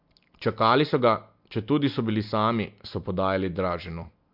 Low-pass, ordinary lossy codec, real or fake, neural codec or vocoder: 5.4 kHz; MP3, 48 kbps; real; none